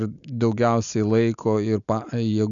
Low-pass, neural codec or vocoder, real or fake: 7.2 kHz; none; real